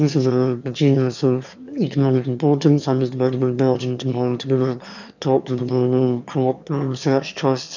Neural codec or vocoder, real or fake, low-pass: autoencoder, 22.05 kHz, a latent of 192 numbers a frame, VITS, trained on one speaker; fake; 7.2 kHz